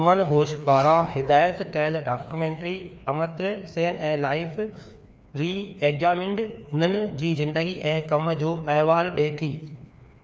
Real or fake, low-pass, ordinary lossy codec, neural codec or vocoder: fake; none; none; codec, 16 kHz, 2 kbps, FreqCodec, larger model